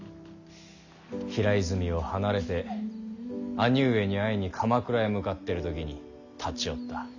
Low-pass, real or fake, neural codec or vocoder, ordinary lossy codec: 7.2 kHz; real; none; none